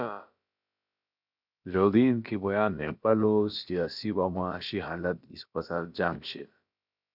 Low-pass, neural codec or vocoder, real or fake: 5.4 kHz; codec, 16 kHz, about 1 kbps, DyCAST, with the encoder's durations; fake